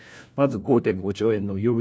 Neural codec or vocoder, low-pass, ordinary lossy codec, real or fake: codec, 16 kHz, 1 kbps, FunCodec, trained on LibriTTS, 50 frames a second; none; none; fake